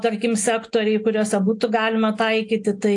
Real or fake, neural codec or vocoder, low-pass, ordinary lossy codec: real; none; 10.8 kHz; AAC, 64 kbps